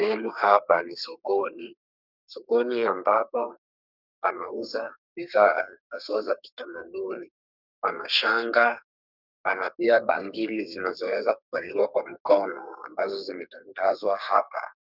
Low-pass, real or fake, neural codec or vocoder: 5.4 kHz; fake; codec, 32 kHz, 1.9 kbps, SNAC